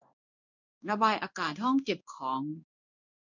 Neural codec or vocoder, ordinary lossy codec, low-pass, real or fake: codec, 16 kHz in and 24 kHz out, 1 kbps, XY-Tokenizer; none; 7.2 kHz; fake